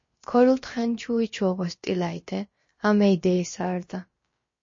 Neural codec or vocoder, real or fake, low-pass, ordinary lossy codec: codec, 16 kHz, about 1 kbps, DyCAST, with the encoder's durations; fake; 7.2 kHz; MP3, 32 kbps